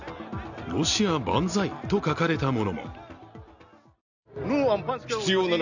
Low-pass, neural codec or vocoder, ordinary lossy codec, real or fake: 7.2 kHz; none; none; real